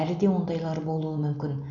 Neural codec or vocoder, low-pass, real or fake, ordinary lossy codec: none; 7.2 kHz; real; none